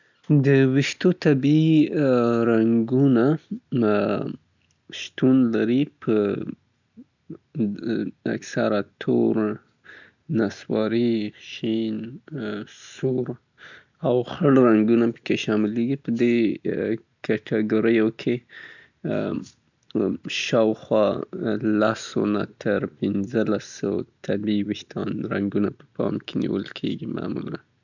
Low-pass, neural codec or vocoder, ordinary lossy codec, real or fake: 7.2 kHz; none; none; real